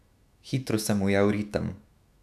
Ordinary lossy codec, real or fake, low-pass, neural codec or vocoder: none; fake; 14.4 kHz; autoencoder, 48 kHz, 128 numbers a frame, DAC-VAE, trained on Japanese speech